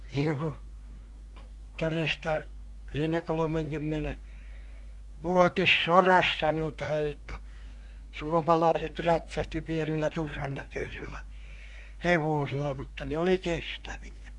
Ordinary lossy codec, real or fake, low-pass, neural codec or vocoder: none; fake; 10.8 kHz; codec, 24 kHz, 1 kbps, SNAC